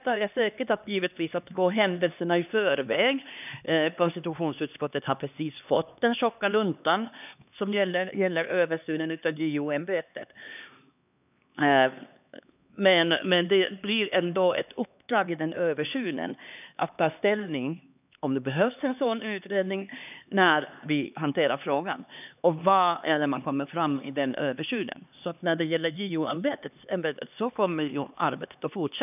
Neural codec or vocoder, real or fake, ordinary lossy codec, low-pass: codec, 16 kHz, 2 kbps, X-Codec, HuBERT features, trained on LibriSpeech; fake; none; 3.6 kHz